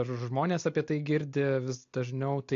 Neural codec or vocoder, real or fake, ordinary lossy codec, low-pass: none; real; AAC, 48 kbps; 7.2 kHz